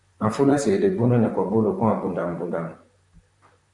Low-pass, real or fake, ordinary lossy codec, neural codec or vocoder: 10.8 kHz; fake; AAC, 64 kbps; vocoder, 44.1 kHz, 128 mel bands, Pupu-Vocoder